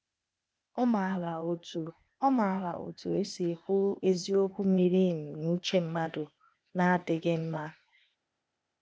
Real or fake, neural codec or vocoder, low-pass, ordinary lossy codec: fake; codec, 16 kHz, 0.8 kbps, ZipCodec; none; none